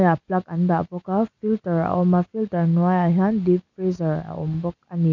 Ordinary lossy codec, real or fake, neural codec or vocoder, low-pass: none; real; none; 7.2 kHz